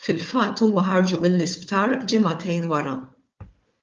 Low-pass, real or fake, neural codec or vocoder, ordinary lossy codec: 7.2 kHz; fake; codec, 16 kHz, 4 kbps, FunCodec, trained on Chinese and English, 50 frames a second; Opus, 32 kbps